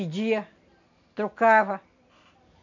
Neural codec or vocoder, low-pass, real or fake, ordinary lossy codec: none; 7.2 kHz; real; none